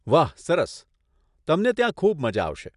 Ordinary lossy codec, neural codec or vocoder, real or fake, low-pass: none; none; real; 10.8 kHz